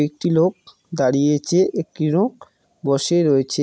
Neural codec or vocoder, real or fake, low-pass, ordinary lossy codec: none; real; none; none